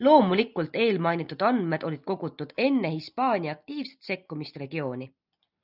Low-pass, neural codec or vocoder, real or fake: 5.4 kHz; none; real